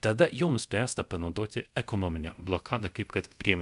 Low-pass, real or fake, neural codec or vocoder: 10.8 kHz; fake; codec, 24 kHz, 0.5 kbps, DualCodec